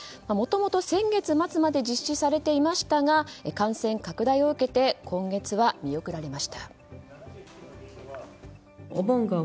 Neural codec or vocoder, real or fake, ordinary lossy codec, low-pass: none; real; none; none